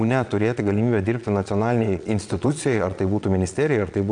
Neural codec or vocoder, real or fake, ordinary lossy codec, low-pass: vocoder, 22.05 kHz, 80 mel bands, Vocos; fake; MP3, 96 kbps; 9.9 kHz